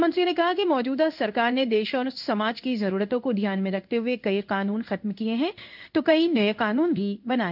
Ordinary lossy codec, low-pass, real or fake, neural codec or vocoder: none; 5.4 kHz; fake; codec, 16 kHz in and 24 kHz out, 1 kbps, XY-Tokenizer